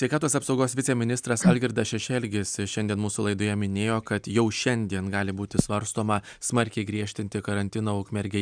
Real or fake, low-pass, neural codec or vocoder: real; 9.9 kHz; none